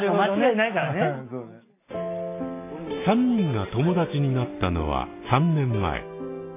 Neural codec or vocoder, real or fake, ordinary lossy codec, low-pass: none; real; AAC, 24 kbps; 3.6 kHz